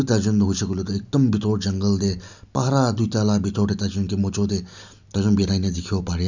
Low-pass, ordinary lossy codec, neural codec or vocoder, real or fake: 7.2 kHz; none; none; real